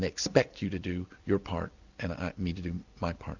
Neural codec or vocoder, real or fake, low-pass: none; real; 7.2 kHz